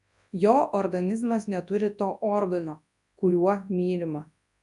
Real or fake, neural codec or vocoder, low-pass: fake; codec, 24 kHz, 0.9 kbps, WavTokenizer, large speech release; 10.8 kHz